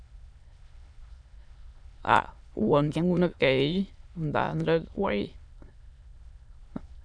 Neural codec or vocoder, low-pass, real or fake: autoencoder, 22.05 kHz, a latent of 192 numbers a frame, VITS, trained on many speakers; 9.9 kHz; fake